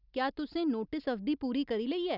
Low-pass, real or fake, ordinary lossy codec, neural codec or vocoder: 5.4 kHz; real; none; none